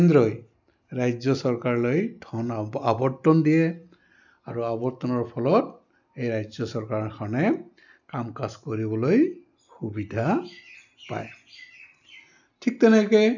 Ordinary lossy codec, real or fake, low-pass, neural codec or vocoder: none; real; 7.2 kHz; none